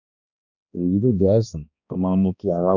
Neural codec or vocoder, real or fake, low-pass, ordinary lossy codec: codec, 16 kHz, 1 kbps, X-Codec, HuBERT features, trained on balanced general audio; fake; 7.2 kHz; none